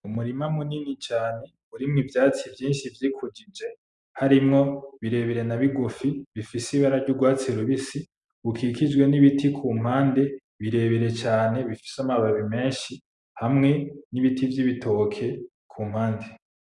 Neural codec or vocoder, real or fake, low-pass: none; real; 10.8 kHz